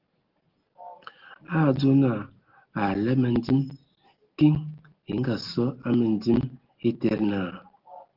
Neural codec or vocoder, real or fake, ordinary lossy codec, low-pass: none; real; Opus, 16 kbps; 5.4 kHz